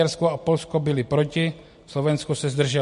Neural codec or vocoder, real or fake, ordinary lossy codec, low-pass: none; real; MP3, 48 kbps; 10.8 kHz